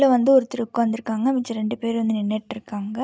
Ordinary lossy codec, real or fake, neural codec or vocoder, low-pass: none; real; none; none